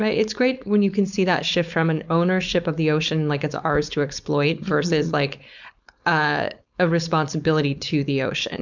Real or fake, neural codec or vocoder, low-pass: fake; codec, 16 kHz, 4.8 kbps, FACodec; 7.2 kHz